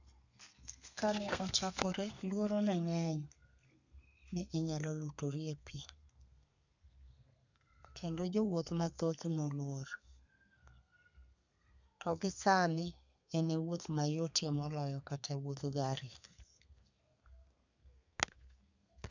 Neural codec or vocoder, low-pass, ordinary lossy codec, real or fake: codec, 32 kHz, 1.9 kbps, SNAC; 7.2 kHz; none; fake